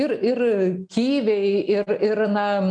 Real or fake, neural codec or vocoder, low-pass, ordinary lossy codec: real; none; 9.9 kHz; MP3, 96 kbps